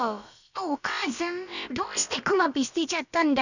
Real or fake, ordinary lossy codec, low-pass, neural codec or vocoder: fake; none; 7.2 kHz; codec, 16 kHz, about 1 kbps, DyCAST, with the encoder's durations